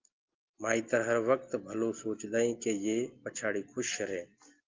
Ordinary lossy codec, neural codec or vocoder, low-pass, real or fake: Opus, 32 kbps; none; 7.2 kHz; real